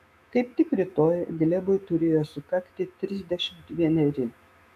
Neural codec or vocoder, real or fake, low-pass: autoencoder, 48 kHz, 128 numbers a frame, DAC-VAE, trained on Japanese speech; fake; 14.4 kHz